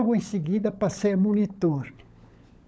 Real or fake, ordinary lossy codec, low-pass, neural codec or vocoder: fake; none; none; codec, 16 kHz, 16 kbps, FunCodec, trained on LibriTTS, 50 frames a second